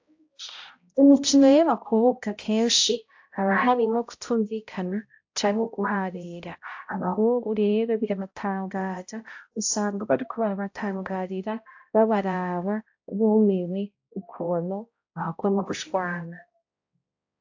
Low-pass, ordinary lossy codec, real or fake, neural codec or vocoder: 7.2 kHz; AAC, 48 kbps; fake; codec, 16 kHz, 0.5 kbps, X-Codec, HuBERT features, trained on balanced general audio